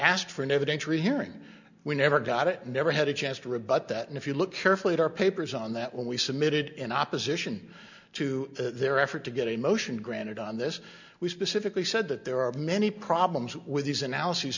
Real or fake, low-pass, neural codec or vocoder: real; 7.2 kHz; none